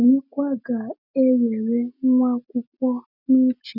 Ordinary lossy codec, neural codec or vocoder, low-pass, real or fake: none; none; 5.4 kHz; real